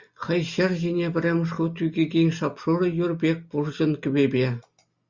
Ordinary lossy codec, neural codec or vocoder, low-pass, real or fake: Opus, 64 kbps; none; 7.2 kHz; real